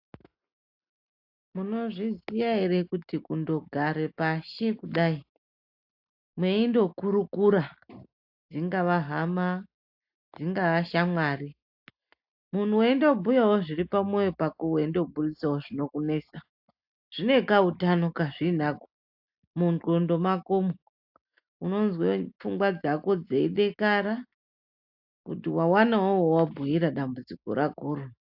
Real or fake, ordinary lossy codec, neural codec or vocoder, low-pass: real; AAC, 48 kbps; none; 5.4 kHz